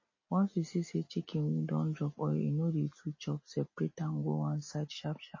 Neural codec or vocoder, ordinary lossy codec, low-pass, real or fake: none; MP3, 32 kbps; 7.2 kHz; real